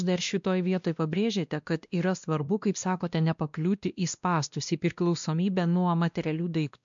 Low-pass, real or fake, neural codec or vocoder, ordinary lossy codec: 7.2 kHz; fake; codec, 16 kHz, 1 kbps, X-Codec, WavLM features, trained on Multilingual LibriSpeech; MP3, 64 kbps